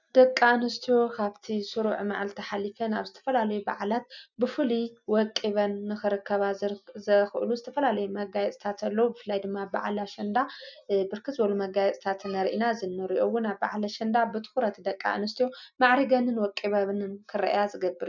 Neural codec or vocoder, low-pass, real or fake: none; 7.2 kHz; real